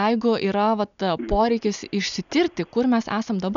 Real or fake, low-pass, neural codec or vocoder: real; 7.2 kHz; none